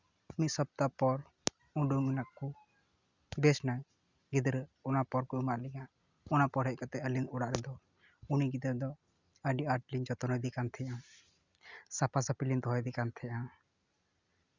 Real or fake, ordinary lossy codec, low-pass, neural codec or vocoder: real; Opus, 64 kbps; 7.2 kHz; none